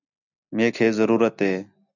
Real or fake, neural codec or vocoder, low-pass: real; none; 7.2 kHz